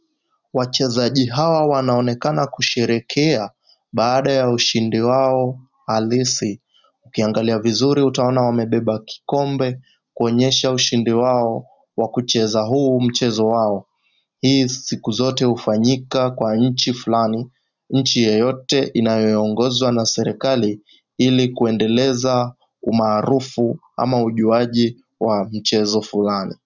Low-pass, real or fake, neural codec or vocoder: 7.2 kHz; real; none